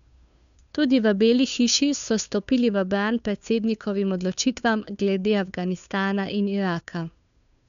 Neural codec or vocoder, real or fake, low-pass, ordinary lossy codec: codec, 16 kHz, 2 kbps, FunCodec, trained on Chinese and English, 25 frames a second; fake; 7.2 kHz; none